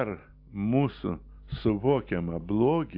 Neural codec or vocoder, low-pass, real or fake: none; 5.4 kHz; real